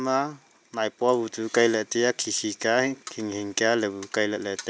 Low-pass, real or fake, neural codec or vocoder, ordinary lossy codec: none; real; none; none